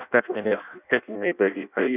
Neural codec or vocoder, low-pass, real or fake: codec, 16 kHz in and 24 kHz out, 0.6 kbps, FireRedTTS-2 codec; 3.6 kHz; fake